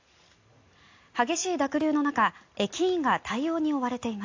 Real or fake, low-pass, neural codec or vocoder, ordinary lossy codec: real; 7.2 kHz; none; AAC, 48 kbps